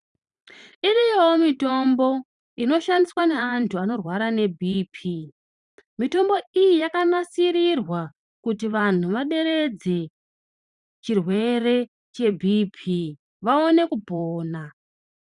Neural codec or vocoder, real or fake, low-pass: vocoder, 44.1 kHz, 128 mel bands every 512 samples, BigVGAN v2; fake; 10.8 kHz